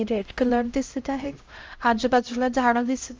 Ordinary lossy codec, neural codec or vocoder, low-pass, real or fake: Opus, 24 kbps; codec, 16 kHz, 0.5 kbps, X-Codec, HuBERT features, trained on LibriSpeech; 7.2 kHz; fake